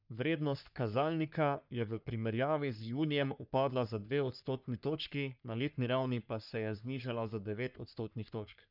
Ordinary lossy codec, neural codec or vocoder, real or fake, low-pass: none; codec, 44.1 kHz, 3.4 kbps, Pupu-Codec; fake; 5.4 kHz